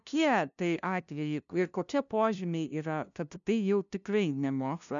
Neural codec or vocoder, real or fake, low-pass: codec, 16 kHz, 0.5 kbps, FunCodec, trained on LibriTTS, 25 frames a second; fake; 7.2 kHz